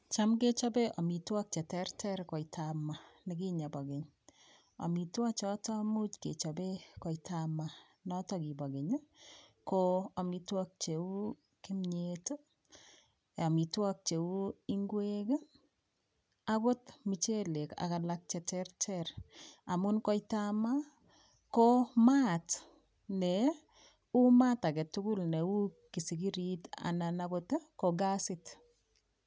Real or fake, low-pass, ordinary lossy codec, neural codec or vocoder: real; none; none; none